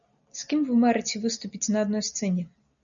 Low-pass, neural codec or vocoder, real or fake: 7.2 kHz; none; real